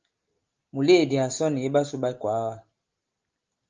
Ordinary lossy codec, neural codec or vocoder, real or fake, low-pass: Opus, 24 kbps; none; real; 7.2 kHz